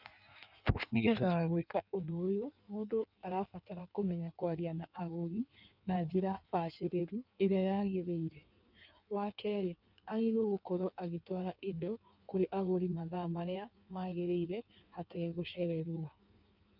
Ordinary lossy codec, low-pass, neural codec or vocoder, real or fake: none; 5.4 kHz; codec, 16 kHz in and 24 kHz out, 1.1 kbps, FireRedTTS-2 codec; fake